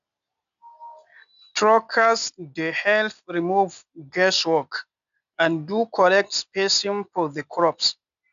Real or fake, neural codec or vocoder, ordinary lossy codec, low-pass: real; none; none; 7.2 kHz